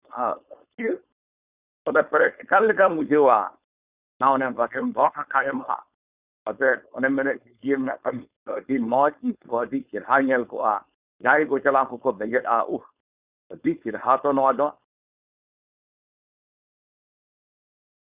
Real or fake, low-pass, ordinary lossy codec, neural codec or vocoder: fake; 3.6 kHz; Opus, 64 kbps; codec, 16 kHz, 4.8 kbps, FACodec